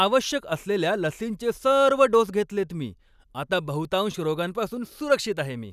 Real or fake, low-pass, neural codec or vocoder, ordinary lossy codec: real; 14.4 kHz; none; none